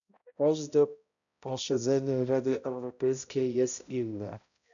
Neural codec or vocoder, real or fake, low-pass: codec, 16 kHz, 0.5 kbps, X-Codec, HuBERT features, trained on balanced general audio; fake; 7.2 kHz